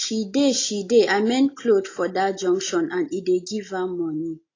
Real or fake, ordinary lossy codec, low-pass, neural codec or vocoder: real; AAC, 32 kbps; 7.2 kHz; none